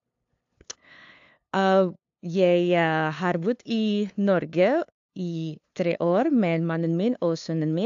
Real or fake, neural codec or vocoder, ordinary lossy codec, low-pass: fake; codec, 16 kHz, 2 kbps, FunCodec, trained on LibriTTS, 25 frames a second; AAC, 64 kbps; 7.2 kHz